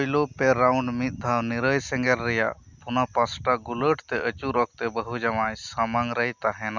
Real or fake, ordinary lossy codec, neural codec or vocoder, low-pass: real; Opus, 64 kbps; none; 7.2 kHz